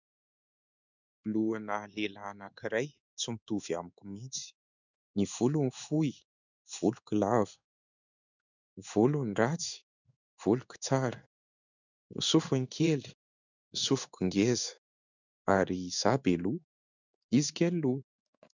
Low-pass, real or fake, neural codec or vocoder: 7.2 kHz; fake; codec, 16 kHz in and 24 kHz out, 1 kbps, XY-Tokenizer